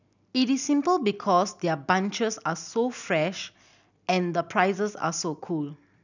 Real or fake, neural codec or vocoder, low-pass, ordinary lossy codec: real; none; 7.2 kHz; none